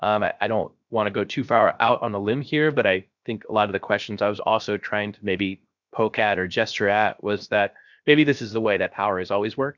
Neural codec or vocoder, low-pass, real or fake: codec, 16 kHz, 0.7 kbps, FocalCodec; 7.2 kHz; fake